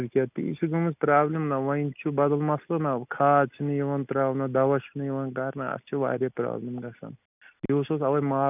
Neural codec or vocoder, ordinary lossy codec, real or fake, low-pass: none; none; real; 3.6 kHz